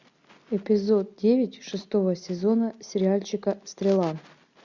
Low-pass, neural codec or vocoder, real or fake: 7.2 kHz; none; real